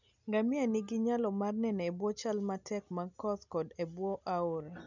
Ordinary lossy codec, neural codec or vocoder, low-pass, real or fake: none; none; 7.2 kHz; real